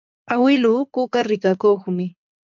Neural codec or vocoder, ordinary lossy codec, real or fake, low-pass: codec, 24 kHz, 6 kbps, HILCodec; MP3, 64 kbps; fake; 7.2 kHz